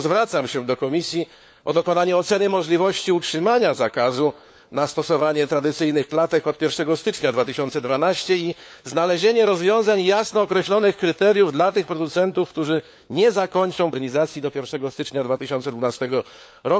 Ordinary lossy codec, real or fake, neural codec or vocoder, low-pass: none; fake; codec, 16 kHz, 4 kbps, FunCodec, trained on LibriTTS, 50 frames a second; none